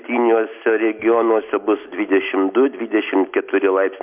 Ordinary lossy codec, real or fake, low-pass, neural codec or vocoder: MP3, 32 kbps; real; 3.6 kHz; none